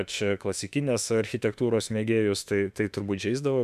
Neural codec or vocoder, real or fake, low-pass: autoencoder, 48 kHz, 32 numbers a frame, DAC-VAE, trained on Japanese speech; fake; 14.4 kHz